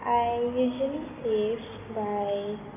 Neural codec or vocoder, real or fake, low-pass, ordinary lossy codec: none; real; 3.6 kHz; none